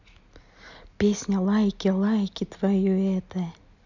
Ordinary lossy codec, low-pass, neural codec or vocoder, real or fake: none; 7.2 kHz; none; real